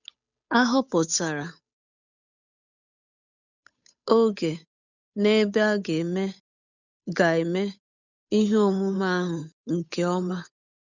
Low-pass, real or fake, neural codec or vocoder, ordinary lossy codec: 7.2 kHz; fake; codec, 16 kHz, 8 kbps, FunCodec, trained on Chinese and English, 25 frames a second; none